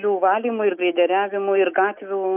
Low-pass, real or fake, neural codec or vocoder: 3.6 kHz; real; none